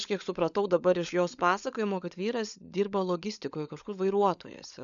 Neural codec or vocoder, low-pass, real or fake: codec, 16 kHz, 16 kbps, FunCodec, trained on LibriTTS, 50 frames a second; 7.2 kHz; fake